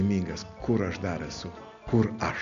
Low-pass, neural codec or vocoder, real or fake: 7.2 kHz; none; real